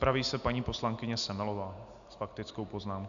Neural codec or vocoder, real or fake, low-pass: none; real; 7.2 kHz